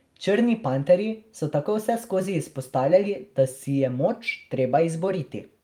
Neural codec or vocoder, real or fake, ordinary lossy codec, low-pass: vocoder, 44.1 kHz, 128 mel bands every 256 samples, BigVGAN v2; fake; Opus, 32 kbps; 19.8 kHz